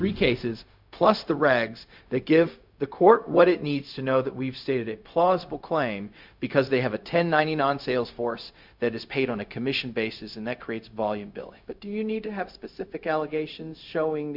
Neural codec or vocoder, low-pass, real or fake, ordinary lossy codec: codec, 16 kHz, 0.4 kbps, LongCat-Audio-Codec; 5.4 kHz; fake; MP3, 48 kbps